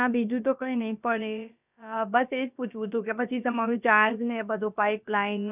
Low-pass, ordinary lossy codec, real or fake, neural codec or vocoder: 3.6 kHz; none; fake; codec, 16 kHz, about 1 kbps, DyCAST, with the encoder's durations